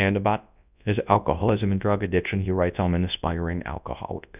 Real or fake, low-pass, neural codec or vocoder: fake; 3.6 kHz; codec, 24 kHz, 0.9 kbps, WavTokenizer, large speech release